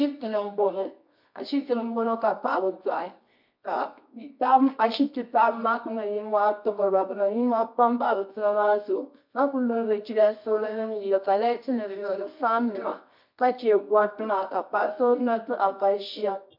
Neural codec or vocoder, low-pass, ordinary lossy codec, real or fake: codec, 24 kHz, 0.9 kbps, WavTokenizer, medium music audio release; 5.4 kHz; MP3, 48 kbps; fake